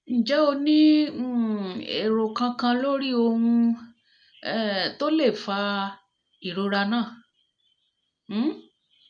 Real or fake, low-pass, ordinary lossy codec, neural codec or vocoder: real; 9.9 kHz; none; none